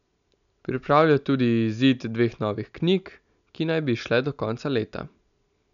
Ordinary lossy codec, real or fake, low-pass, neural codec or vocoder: none; real; 7.2 kHz; none